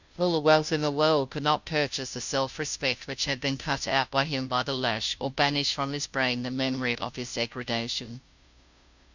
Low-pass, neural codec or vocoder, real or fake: 7.2 kHz; codec, 16 kHz, 0.5 kbps, FunCodec, trained on Chinese and English, 25 frames a second; fake